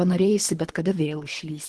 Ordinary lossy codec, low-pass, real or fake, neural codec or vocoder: Opus, 16 kbps; 10.8 kHz; fake; codec, 24 kHz, 3 kbps, HILCodec